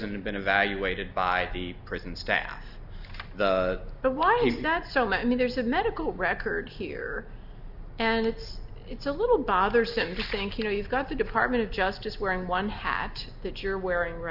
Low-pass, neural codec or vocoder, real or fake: 5.4 kHz; none; real